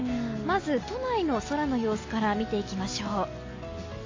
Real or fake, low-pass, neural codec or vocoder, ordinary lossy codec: real; 7.2 kHz; none; AAC, 32 kbps